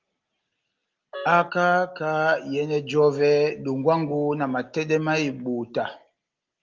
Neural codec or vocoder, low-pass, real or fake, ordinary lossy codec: none; 7.2 kHz; real; Opus, 32 kbps